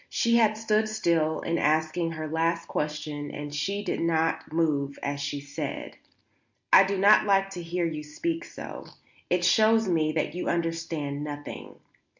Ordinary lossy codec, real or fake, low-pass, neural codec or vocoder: MP3, 64 kbps; real; 7.2 kHz; none